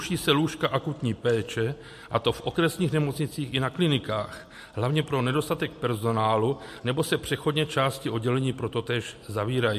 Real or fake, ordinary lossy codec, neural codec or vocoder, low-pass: real; MP3, 64 kbps; none; 14.4 kHz